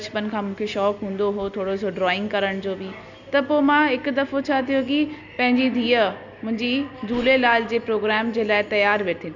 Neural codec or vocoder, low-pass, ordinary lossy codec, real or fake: none; 7.2 kHz; none; real